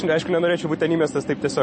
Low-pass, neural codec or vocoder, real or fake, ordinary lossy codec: 9.9 kHz; none; real; MP3, 32 kbps